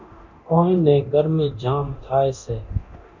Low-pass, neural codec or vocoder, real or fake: 7.2 kHz; codec, 24 kHz, 0.9 kbps, DualCodec; fake